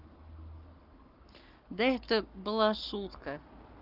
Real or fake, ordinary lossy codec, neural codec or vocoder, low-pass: fake; Opus, 32 kbps; codec, 44.1 kHz, 7.8 kbps, Pupu-Codec; 5.4 kHz